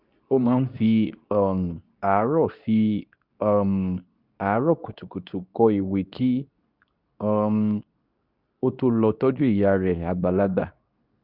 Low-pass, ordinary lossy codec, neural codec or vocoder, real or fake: 5.4 kHz; none; codec, 24 kHz, 0.9 kbps, WavTokenizer, medium speech release version 2; fake